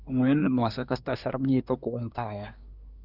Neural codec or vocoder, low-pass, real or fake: codec, 24 kHz, 1 kbps, SNAC; 5.4 kHz; fake